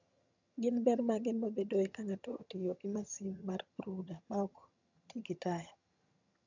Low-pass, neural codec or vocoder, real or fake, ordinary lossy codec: 7.2 kHz; vocoder, 22.05 kHz, 80 mel bands, HiFi-GAN; fake; none